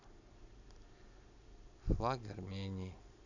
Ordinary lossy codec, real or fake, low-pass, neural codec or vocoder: none; real; 7.2 kHz; none